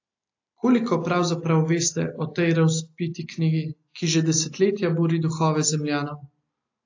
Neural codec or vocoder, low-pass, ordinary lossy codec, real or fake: none; 7.2 kHz; AAC, 48 kbps; real